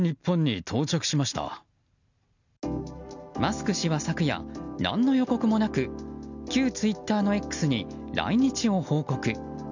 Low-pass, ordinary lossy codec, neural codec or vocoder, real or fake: 7.2 kHz; none; none; real